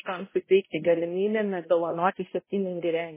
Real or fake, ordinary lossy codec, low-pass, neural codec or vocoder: fake; MP3, 16 kbps; 3.6 kHz; codec, 16 kHz, 0.5 kbps, X-Codec, HuBERT features, trained on balanced general audio